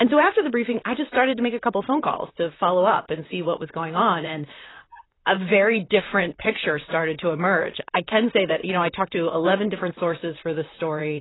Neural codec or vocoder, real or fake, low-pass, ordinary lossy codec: vocoder, 44.1 kHz, 128 mel bands, Pupu-Vocoder; fake; 7.2 kHz; AAC, 16 kbps